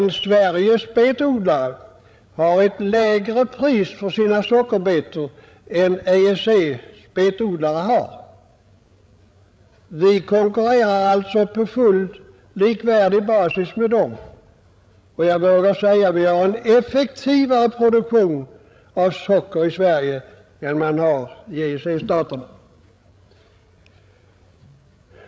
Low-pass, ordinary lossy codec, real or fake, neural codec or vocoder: none; none; fake; codec, 16 kHz, 16 kbps, FreqCodec, larger model